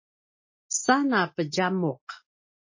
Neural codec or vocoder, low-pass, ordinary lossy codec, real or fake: none; 7.2 kHz; MP3, 32 kbps; real